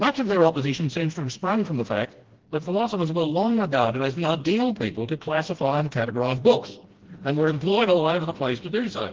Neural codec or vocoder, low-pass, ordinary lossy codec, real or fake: codec, 16 kHz, 1 kbps, FreqCodec, smaller model; 7.2 kHz; Opus, 16 kbps; fake